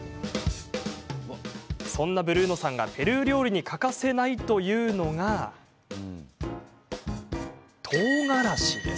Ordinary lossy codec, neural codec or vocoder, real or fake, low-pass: none; none; real; none